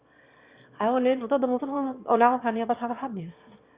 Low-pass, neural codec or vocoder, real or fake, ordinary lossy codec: 3.6 kHz; autoencoder, 22.05 kHz, a latent of 192 numbers a frame, VITS, trained on one speaker; fake; Opus, 64 kbps